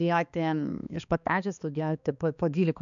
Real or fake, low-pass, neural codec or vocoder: fake; 7.2 kHz; codec, 16 kHz, 2 kbps, X-Codec, HuBERT features, trained on balanced general audio